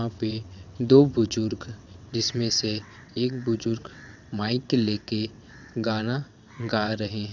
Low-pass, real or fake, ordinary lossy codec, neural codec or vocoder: 7.2 kHz; fake; none; vocoder, 22.05 kHz, 80 mel bands, WaveNeXt